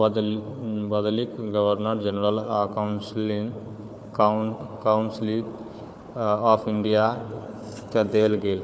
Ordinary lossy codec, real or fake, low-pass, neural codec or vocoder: none; fake; none; codec, 16 kHz, 4 kbps, FunCodec, trained on Chinese and English, 50 frames a second